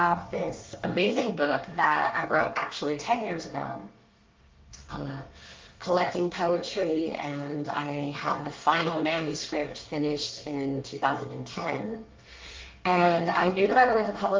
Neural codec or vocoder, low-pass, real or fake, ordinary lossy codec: codec, 24 kHz, 1 kbps, SNAC; 7.2 kHz; fake; Opus, 32 kbps